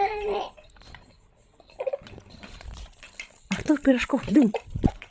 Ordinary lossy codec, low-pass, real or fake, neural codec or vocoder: none; none; fake; codec, 16 kHz, 16 kbps, FreqCodec, larger model